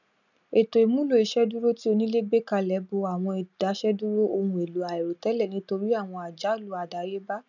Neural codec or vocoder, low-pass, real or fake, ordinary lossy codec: none; 7.2 kHz; real; none